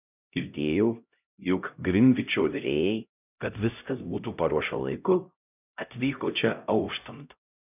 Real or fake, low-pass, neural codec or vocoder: fake; 3.6 kHz; codec, 16 kHz, 0.5 kbps, X-Codec, HuBERT features, trained on LibriSpeech